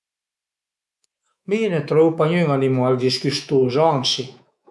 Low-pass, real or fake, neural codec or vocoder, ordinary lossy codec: none; fake; codec, 24 kHz, 3.1 kbps, DualCodec; none